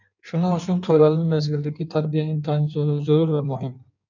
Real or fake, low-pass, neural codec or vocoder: fake; 7.2 kHz; codec, 16 kHz in and 24 kHz out, 1.1 kbps, FireRedTTS-2 codec